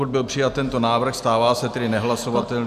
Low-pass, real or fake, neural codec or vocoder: 14.4 kHz; real; none